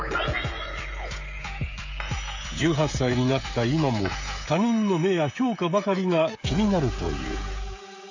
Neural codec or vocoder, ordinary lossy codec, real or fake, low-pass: codec, 16 kHz, 16 kbps, FreqCodec, smaller model; AAC, 48 kbps; fake; 7.2 kHz